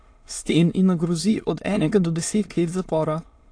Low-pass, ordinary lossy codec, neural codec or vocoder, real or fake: 9.9 kHz; AAC, 48 kbps; autoencoder, 22.05 kHz, a latent of 192 numbers a frame, VITS, trained on many speakers; fake